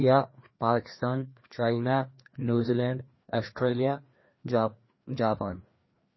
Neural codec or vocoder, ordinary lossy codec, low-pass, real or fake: codec, 16 kHz, 2 kbps, FreqCodec, larger model; MP3, 24 kbps; 7.2 kHz; fake